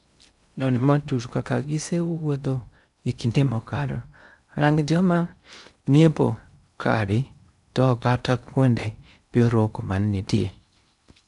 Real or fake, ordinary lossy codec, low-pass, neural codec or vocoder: fake; none; 10.8 kHz; codec, 16 kHz in and 24 kHz out, 0.6 kbps, FocalCodec, streaming, 2048 codes